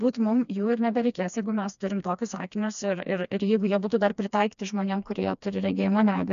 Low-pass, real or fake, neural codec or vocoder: 7.2 kHz; fake; codec, 16 kHz, 2 kbps, FreqCodec, smaller model